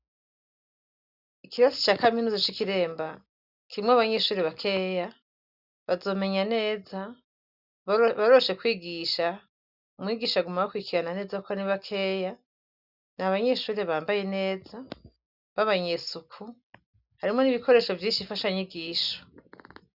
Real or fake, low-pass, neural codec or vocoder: real; 5.4 kHz; none